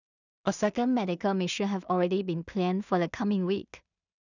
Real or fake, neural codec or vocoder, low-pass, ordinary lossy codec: fake; codec, 16 kHz in and 24 kHz out, 0.4 kbps, LongCat-Audio-Codec, two codebook decoder; 7.2 kHz; none